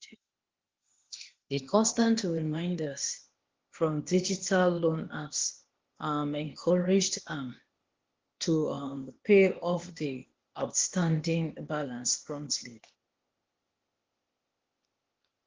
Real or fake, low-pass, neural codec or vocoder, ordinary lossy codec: fake; 7.2 kHz; codec, 16 kHz, 0.8 kbps, ZipCodec; Opus, 16 kbps